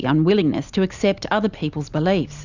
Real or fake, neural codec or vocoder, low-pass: real; none; 7.2 kHz